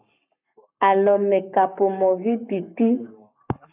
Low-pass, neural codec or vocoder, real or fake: 3.6 kHz; codec, 44.1 kHz, 7.8 kbps, Pupu-Codec; fake